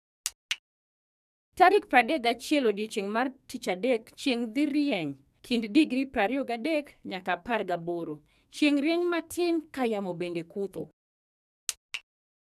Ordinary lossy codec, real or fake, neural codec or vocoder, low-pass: none; fake; codec, 44.1 kHz, 2.6 kbps, SNAC; 14.4 kHz